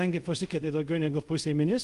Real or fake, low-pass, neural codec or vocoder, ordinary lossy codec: fake; 10.8 kHz; codec, 24 kHz, 0.5 kbps, DualCodec; Opus, 32 kbps